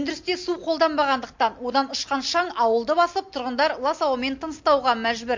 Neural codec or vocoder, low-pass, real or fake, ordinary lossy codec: none; 7.2 kHz; real; MP3, 48 kbps